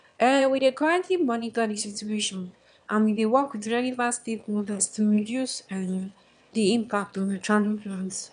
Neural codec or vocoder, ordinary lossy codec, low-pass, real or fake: autoencoder, 22.05 kHz, a latent of 192 numbers a frame, VITS, trained on one speaker; none; 9.9 kHz; fake